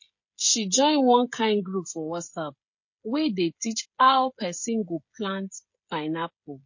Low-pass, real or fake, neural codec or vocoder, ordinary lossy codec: 7.2 kHz; fake; codec, 16 kHz, 16 kbps, FreqCodec, smaller model; MP3, 32 kbps